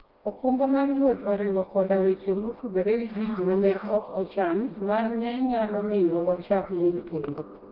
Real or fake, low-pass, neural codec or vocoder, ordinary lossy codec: fake; 5.4 kHz; codec, 16 kHz, 1 kbps, FreqCodec, smaller model; Opus, 24 kbps